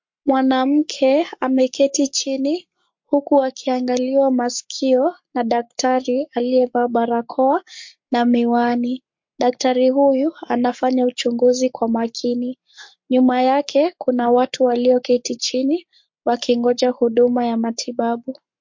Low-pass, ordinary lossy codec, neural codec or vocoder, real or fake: 7.2 kHz; MP3, 48 kbps; codec, 44.1 kHz, 7.8 kbps, Pupu-Codec; fake